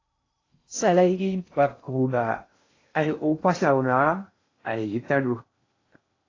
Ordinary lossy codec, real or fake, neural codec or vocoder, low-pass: AAC, 32 kbps; fake; codec, 16 kHz in and 24 kHz out, 0.6 kbps, FocalCodec, streaming, 2048 codes; 7.2 kHz